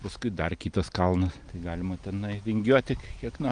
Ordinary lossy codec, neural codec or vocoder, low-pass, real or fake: Opus, 32 kbps; none; 9.9 kHz; real